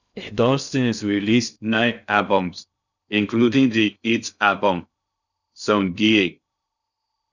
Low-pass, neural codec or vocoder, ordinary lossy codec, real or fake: 7.2 kHz; codec, 16 kHz in and 24 kHz out, 0.6 kbps, FocalCodec, streaming, 2048 codes; none; fake